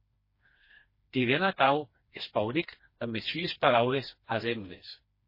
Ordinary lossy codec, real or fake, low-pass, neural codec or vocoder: MP3, 24 kbps; fake; 5.4 kHz; codec, 16 kHz, 2 kbps, FreqCodec, smaller model